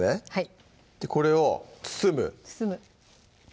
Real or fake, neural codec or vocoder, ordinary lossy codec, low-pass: real; none; none; none